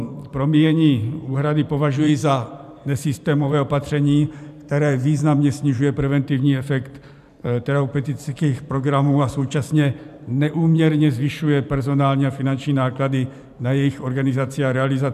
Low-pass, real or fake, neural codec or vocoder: 14.4 kHz; fake; vocoder, 44.1 kHz, 128 mel bands every 512 samples, BigVGAN v2